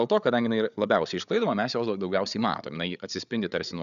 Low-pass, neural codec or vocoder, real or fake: 7.2 kHz; codec, 16 kHz, 8 kbps, FreqCodec, larger model; fake